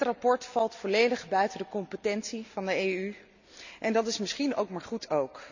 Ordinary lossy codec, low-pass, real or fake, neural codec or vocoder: none; 7.2 kHz; real; none